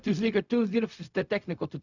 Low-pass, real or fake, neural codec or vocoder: 7.2 kHz; fake; codec, 16 kHz, 0.4 kbps, LongCat-Audio-Codec